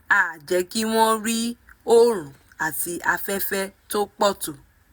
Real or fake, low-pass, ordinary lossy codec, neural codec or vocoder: real; none; none; none